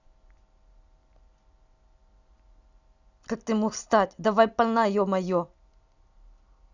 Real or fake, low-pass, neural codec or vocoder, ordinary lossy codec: real; 7.2 kHz; none; none